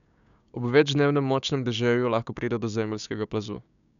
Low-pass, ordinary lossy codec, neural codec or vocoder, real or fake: 7.2 kHz; none; codec, 16 kHz, 6 kbps, DAC; fake